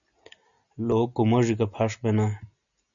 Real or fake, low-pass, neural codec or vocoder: real; 7.2 kHz; none